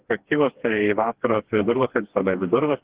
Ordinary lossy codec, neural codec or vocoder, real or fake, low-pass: Opus, 16 kbps; codec, 16 kHz, 2 kbps, FreqCodec, smaller model; fake; 3.6 kHz